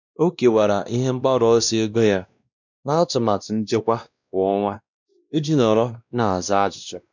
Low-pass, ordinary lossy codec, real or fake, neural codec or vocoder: 7.2 kHz; none; fake; codec, 16 kHz, 1 kbps, X-Codec, WavLM features, trained on Multilingual LibriSpeech